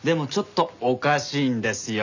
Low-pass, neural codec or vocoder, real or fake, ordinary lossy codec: 7.2 kHz; none; real; none